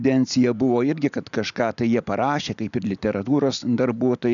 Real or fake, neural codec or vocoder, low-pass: real; none; 7.2 kHz